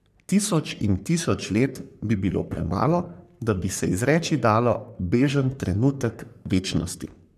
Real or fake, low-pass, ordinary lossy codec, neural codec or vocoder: fake; 14.4 kHz; none; codec, 44.1 kHz, 3.4 kbps, Pupu-Codec